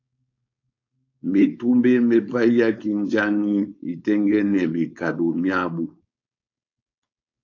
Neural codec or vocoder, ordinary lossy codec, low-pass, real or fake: codec, 16 kHz, 4.8 kbps, FACodec; AAC, 48 kbps; 7.2 kHz; fake